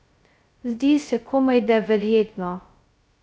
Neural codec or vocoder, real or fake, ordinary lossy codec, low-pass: codec, 16 kHz, 0.2 kbps, FocalCodec; fake; none; none